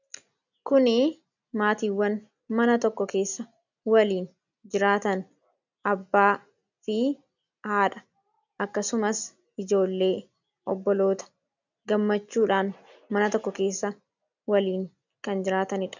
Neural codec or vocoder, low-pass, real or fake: none; 7.2 kHz; real